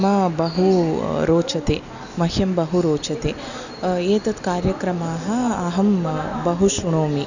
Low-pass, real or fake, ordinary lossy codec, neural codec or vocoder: 7.2 kHz; real; none; none